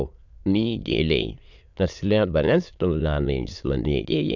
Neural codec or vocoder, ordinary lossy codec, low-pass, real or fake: autoencoder, 22.05 kHz, a latent of 192 numbers a frame, VITS, trained on many speakers; none; 7.2 kHz; fake